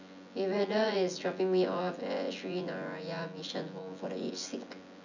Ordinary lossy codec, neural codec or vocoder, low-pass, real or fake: none; vocoder, 24 kHz, 100 mel bands, Vocos; 7.2 kHz; fake